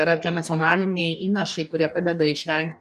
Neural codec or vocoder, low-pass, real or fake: codec, 44.1 kHz, 2.6 kbps, DAC; 14.4 kHz; fake